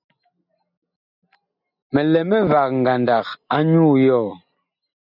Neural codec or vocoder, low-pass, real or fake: none; 5.4 kHz; real